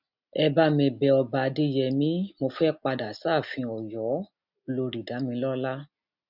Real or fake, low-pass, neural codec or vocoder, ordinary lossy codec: real; 5.4 kHz; none; none